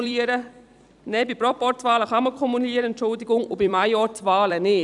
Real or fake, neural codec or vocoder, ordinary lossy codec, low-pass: fake; vocoder, 44.1 kHz, 128 mel bands every 256 samples, BigVGAN v2; none; 10.8 kHz